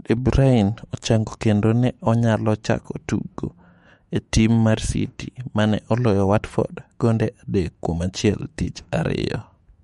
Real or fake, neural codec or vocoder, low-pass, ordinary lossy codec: fake; autoencoder, 48 kHz, 128 numbers a frame, DAC-VAE, trained on Japanese speech; 19.8 kHz; MP3, 48 kbps